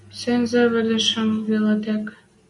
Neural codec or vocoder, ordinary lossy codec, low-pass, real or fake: none; MP3, 64 kbps; 10.8 kHz; real